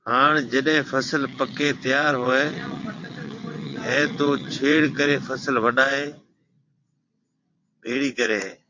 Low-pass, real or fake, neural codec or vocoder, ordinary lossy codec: 7.2 kHz; fake; vocoder, 22.05 kHz, 80 mel bands, WaveNeXt; MP3, 48 kbps